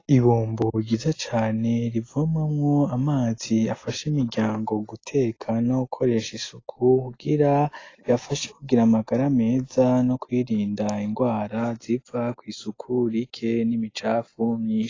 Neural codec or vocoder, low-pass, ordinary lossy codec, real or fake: none; 7.2 kHz; AAC, 32 kbps; real